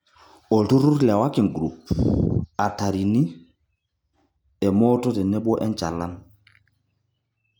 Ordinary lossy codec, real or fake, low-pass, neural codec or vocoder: none; real; none; none